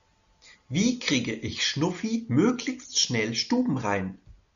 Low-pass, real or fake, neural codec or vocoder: 7.2 kHz; real; none